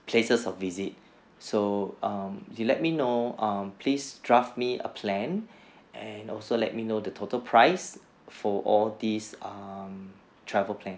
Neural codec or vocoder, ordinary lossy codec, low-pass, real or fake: none; none; none; real